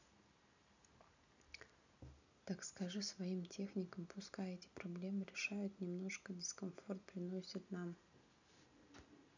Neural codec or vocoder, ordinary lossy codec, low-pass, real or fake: none; none; 7.2 kHz; real